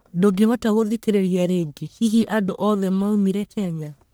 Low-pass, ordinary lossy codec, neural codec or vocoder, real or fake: none; none; codec, 44.1 kHz, 1.7 kbps, Pupu-Codec; fake